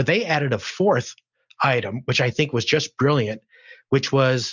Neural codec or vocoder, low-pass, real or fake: none; 7.2 kHz; real